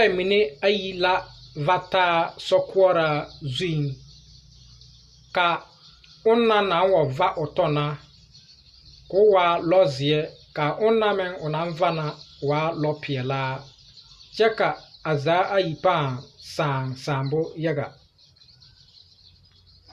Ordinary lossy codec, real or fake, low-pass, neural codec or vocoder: Opus, 64 kbps; real; 14.4 kHz; none